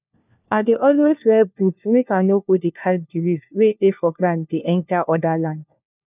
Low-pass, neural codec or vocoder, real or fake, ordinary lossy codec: 3.6 kHz; codec, 16 kHz, 1 kbps, FunCodec, trained on LibriTTS, 50 frames a second; fake; none